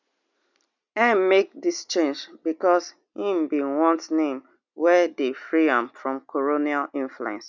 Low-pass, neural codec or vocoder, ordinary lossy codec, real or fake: 7.2 kHz; none; none; real